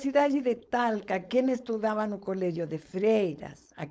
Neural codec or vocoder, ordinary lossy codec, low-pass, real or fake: codec, 16 kHz, 4.8 kbps, FACodec; none; none; fake